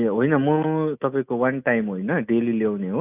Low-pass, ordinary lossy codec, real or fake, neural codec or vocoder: 3.6 kHz; none; real; none